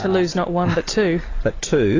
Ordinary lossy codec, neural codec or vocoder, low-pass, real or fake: AAC, 32 kbps; none; 7.2 kHz; real